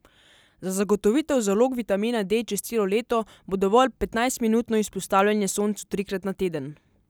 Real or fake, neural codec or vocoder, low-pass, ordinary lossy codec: real; none; none; none